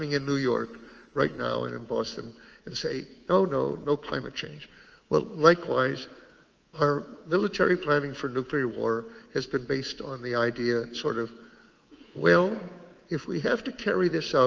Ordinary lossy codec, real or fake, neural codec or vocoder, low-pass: Opus, 24 kbps; fake; codec, 24 kHz, 3.1 kbps, DualCodec; 7.2 kHz